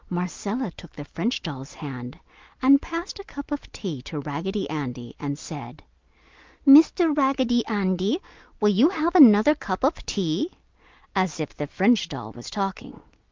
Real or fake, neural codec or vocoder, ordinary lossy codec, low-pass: real; none; Opus, 24 kbps; 7.2 kHz